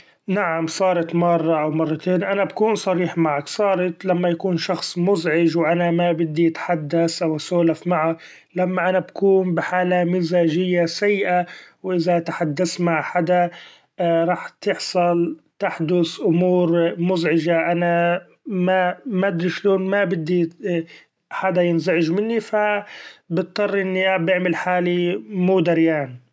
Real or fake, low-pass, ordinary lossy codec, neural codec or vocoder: real; none; none; none